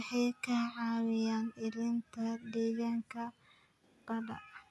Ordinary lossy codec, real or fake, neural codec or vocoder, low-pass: none; real; none; none